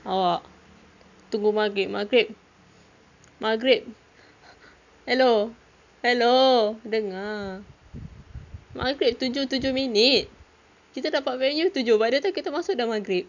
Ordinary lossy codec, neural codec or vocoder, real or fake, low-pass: none; none; real; 7.2 kHz